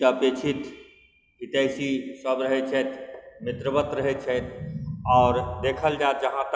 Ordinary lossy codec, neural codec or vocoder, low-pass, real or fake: none; none; none; real